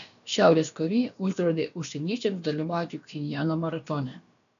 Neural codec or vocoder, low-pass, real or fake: codec, 16 kHz, about 1 kbps, DyCAST, with the encoder's durations; 7.2 kHz; fake